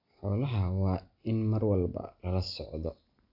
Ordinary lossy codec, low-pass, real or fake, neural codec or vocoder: AAC, 48 kbps; 5.4 kHz; real; none